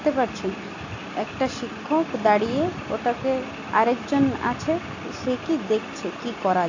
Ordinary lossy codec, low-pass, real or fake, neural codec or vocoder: AAC, 48 kbps; 7.2 kHz; real; none